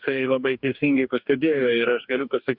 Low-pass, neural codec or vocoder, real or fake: 5.4 kHz; codec, 44.1 kHz, 2.6 kbps, DAC; fake